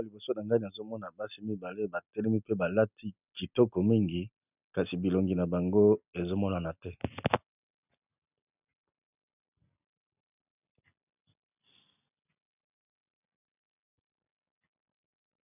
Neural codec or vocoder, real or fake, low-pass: none; real; 3.6 kHz